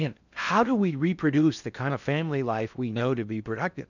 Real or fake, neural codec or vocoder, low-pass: fake; codec, 16 kHz in and 24 kHz out, 0.6 kbps, FocalCodec, streaming, 4096 codes; 7.2 kHz